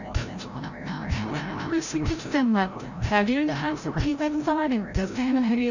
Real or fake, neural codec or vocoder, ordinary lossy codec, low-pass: fake; codec, 16 kHz, 0.5 kbps, FreqCodec, larger model; none; 7.2 kHz